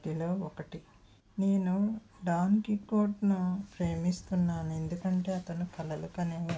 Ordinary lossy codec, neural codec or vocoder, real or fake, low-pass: none; none; real; none